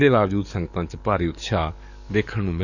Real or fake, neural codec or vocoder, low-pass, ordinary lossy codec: fake; codec, 44.1 kHz, 7.8 kbps, DAC; 7.2 kHz; none